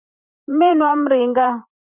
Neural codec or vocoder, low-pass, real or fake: vocoder, 44.1 kHz, 128 mel bands every 512 samples, BigVGAN v2; 3.6 kHz; fake